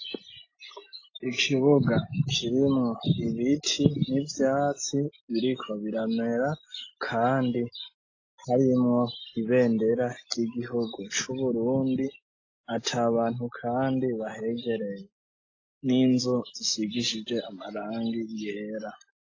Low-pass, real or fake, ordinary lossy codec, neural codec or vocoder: 7.2 kHz; real; AAC, 32 kbps; none